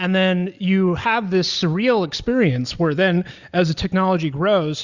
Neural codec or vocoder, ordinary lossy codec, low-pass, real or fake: none; Opus, 64 kbps; 7.2 kHz; real